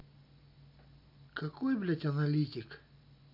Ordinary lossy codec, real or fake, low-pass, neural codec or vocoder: none; real; 5.4 kHz; none